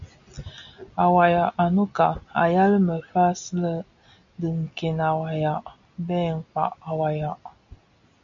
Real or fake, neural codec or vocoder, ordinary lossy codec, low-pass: real; none; AAC, 64 kbps; 7.2 kHz